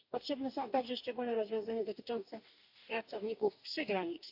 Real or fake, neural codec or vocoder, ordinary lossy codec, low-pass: fake; codec, 44.1 kHz, 2.6 kbps, DAC; AAC, 48 kbps; 5.4 kHz